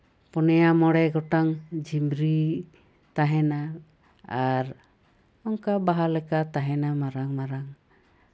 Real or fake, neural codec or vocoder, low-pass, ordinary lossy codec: real; none; none; none